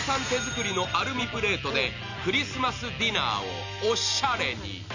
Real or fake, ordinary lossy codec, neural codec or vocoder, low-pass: real; none; none; 7.2 kHz